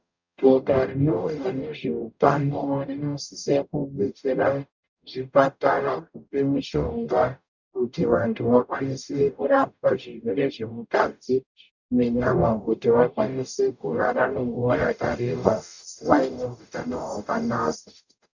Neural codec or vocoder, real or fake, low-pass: codec, 44.1 kHz, 0.9 kbps, DAC; fake; 7.2 kHz